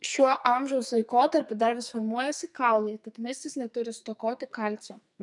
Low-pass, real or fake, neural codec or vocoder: 10.8 kHz; fake; codec, 44.1 kHz, 2.6 kbps, SNAC